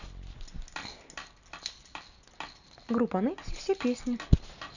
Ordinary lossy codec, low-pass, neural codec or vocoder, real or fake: none; 7.2 kHz; none; real